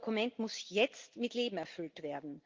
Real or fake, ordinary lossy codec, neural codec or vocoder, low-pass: real; Opus, 32 kbps; none; 7.2 kHz